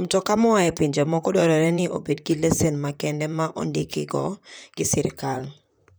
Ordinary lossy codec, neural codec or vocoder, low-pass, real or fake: none; vocoder, 44.1 kHz, 128 mel bands, Pupu-Vocoder; none; fake